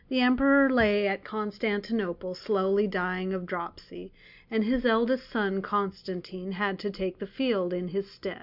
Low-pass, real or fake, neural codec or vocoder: 5.4 kHz; real; none